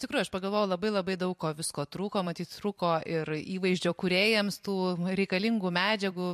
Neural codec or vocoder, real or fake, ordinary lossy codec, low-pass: vocoder, 44.1 kHz, 128 mel bands every 256 samples, BigVGAN v2; fake; MP3, 64 kbps; 14.4 kHz